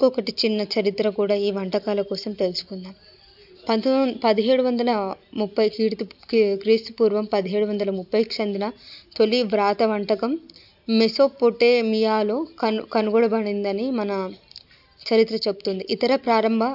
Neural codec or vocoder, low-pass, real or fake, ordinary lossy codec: none; 5.4 kHz; real; AAC, 48 kbps